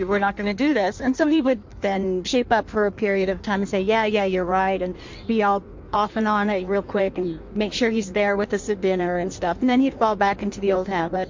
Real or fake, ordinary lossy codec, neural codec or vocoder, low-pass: fake; MP3, 48 kbps; codec, 16 kHz in and 24 kHz out, 1.1 kbps, FireRedTTS-2 codec; 7.2 kHz